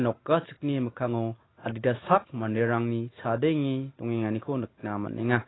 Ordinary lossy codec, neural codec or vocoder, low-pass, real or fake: AAC, 16 kbps; none; 7.2 kHz; real